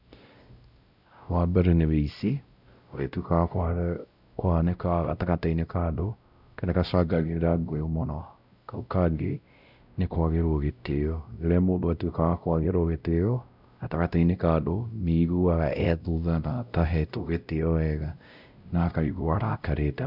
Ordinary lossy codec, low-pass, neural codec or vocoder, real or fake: none; 5.4 kHz; codec, 16 kHz, 0.5 kbps, X-Codec, WavLM features, trained on Multilingual LibriSpeech; fake